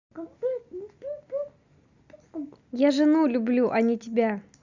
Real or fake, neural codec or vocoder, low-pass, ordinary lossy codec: real; none; 7.2 kHz; Opus, 64 kbps